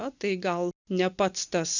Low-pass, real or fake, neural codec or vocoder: 7.2 kHz; real; none